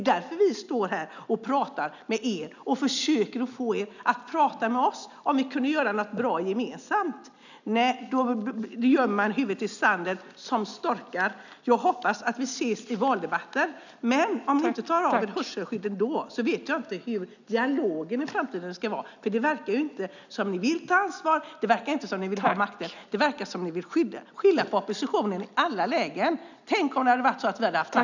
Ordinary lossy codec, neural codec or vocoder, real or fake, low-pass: none; none; real; 7.2 kHz